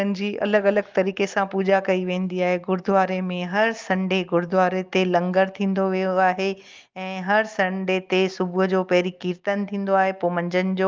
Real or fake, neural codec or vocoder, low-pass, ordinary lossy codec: real; none; 7.2 kHz; Opus, 32 kbps